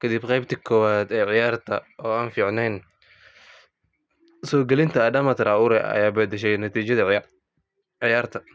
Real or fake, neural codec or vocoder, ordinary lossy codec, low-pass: real; none; none; none